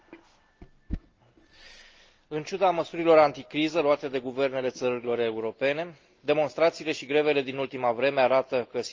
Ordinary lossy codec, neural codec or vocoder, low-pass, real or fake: Opus, 24 kbps; none; 7.2 kHz; real